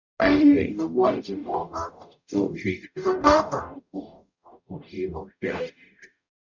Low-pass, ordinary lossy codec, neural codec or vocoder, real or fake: 7.2 kHz; none; codec, 44.1 kHz, 0.9 kbps, DAC; fake